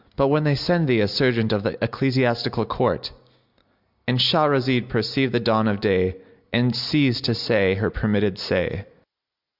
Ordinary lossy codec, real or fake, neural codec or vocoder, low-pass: Opus, 64 kbps; real; none; 5.4 kHz